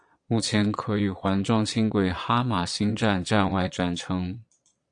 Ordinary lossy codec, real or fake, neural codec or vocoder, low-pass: AAC, 64 kbps; fake; vocoder, 22.05 kHz, 80 mel bands, Vocos; 9.9 kHz